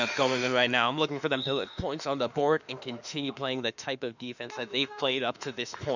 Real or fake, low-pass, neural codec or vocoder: fake; 7.2 kHz; autoencoder, 48 kHz, 32 numbers a frame, DAC-VAE, trained on Japanese speech